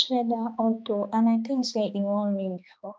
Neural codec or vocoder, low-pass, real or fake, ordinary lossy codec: codec, 16 kHz, 2 kbps, X-Codec, HuBERT features, trained on balanced general audio; none; fake; none